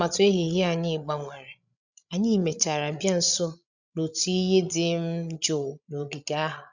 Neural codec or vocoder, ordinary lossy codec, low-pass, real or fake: codec, 16 kHz, 16 kbps, FreqCodec, larger model; none; 7.2 kHz; fake